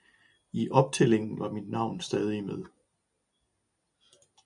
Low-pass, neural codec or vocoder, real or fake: 10.8 kHz; none; real